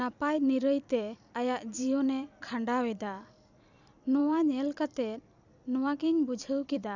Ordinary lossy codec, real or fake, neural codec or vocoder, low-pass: none; fake; vocoder, 44.1 kHz, 128 mel bands every 256 samples, BigVGAN v2; 7.2 kHz